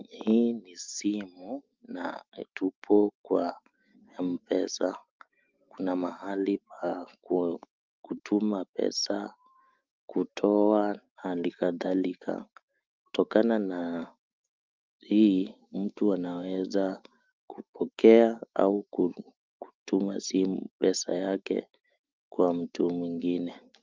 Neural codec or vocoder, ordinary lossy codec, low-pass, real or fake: autoencoder, 48 kHz, 128 numbers a frame, DAC-VAE, trained on Japanese speech; Opus, 24 kbps; 7.2 kHz; fake